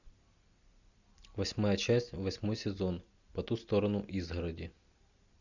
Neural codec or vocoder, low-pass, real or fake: none; 7.2 kHz; real